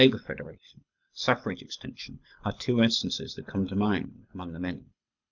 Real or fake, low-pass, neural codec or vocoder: fake; 7.2 kHz; codec, 24 kHz, 6 kbps, HILCodec